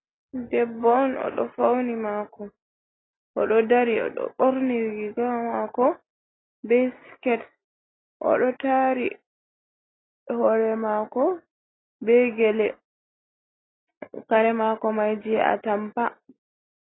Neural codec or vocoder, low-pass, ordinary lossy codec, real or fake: none; 7.2 kHz; AAC, 16 kbps; real